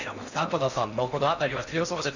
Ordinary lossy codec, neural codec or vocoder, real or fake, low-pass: none; codec, 16 kHz in and 24 kHz out, 0.6 kbps, FocalCodec, streaming, 4096 codes; fake; 7.2 kHz